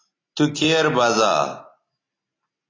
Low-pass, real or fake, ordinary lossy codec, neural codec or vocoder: 7.2 kHz; real; AAC, 32 kbps; none